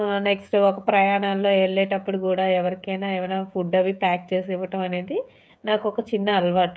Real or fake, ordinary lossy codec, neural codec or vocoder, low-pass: fake; none; codec, 16 kHz, 16 kbps, FreqCodec, smaller model; none